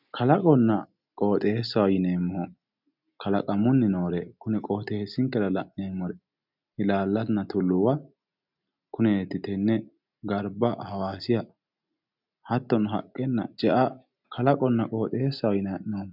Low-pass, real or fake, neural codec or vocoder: 5.4 kHz; real; none